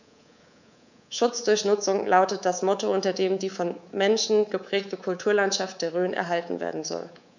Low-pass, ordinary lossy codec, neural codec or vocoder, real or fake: 7.2 kHz; none; codec, 24 kHz, 3.1 kbps, DualCodec; fake